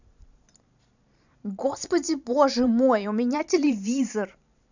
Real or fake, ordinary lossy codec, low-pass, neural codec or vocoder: fake; none; 7.2 kHz; vocoder, 44.1 kHz, 128 mel bands every 512 samples, BigVGAN v2